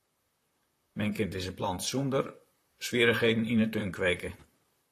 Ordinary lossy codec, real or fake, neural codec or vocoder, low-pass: AAC, 48 kbps; fake; vocoder, 44.1 kHz, 128 mel bands, Pupu-Vocoder; 14.4 kHz